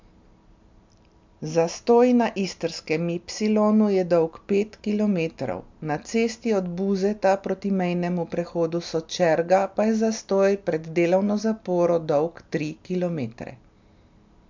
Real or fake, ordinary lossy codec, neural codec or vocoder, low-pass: real; MP3, 64 kbps; none; 7.2 kHz